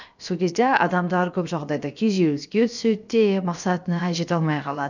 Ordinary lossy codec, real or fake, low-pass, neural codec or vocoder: none; fake; 7.2 kHz; codec, 16 kHz, about 1 kbps, DyCAST, with the encoder's durations